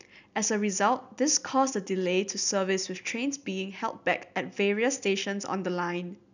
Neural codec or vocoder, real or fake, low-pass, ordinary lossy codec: none; real; 7.2 kHz; none